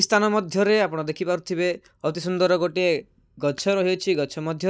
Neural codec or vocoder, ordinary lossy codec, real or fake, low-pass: none; none; real; none